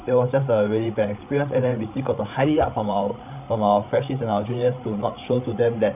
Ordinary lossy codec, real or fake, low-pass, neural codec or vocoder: none; fake; 3.6 kHz; codec, 16 kHz, 16 kbps, FreqCodec, larger model